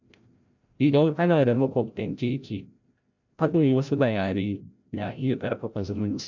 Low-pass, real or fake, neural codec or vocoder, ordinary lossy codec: 7.2 kHz; fake; codec, 16 kHz, 0.5 kbps, FreqCodec, larger model; none